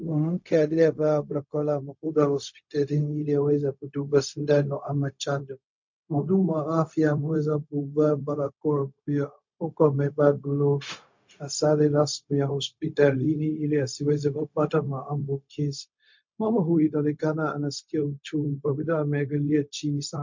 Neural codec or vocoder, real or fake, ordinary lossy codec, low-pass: codec, 16 kHz, 0.4 kbps, LongCat-Audio-Codec; fake; MP3, 48 kbps; 7.2 kHz